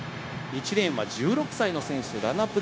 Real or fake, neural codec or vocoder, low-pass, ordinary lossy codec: fake; codec, 16 kHz, 0.9 kbps, LongCat-Audio-Codec; none; none